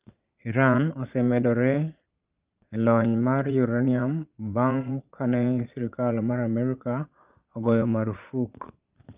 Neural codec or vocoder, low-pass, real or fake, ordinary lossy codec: vocoder, 22.05 kHz, 80 mel bands, Vocos; 3.6 kHz; fake; Opus, 24 kbps